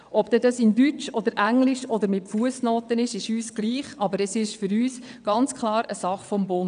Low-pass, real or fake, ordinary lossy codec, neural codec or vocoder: 9.9 kHz; fake; none; vocoder, 22.05 kHz, 80 mel bands, WaveNeXt